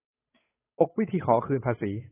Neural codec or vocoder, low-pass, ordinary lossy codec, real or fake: codec, 16 kHz, 8 kbps, FunCodec, trained on Chinese and English, 25 frames a second; 3.6 kHz; AAC, 16 kbps; fake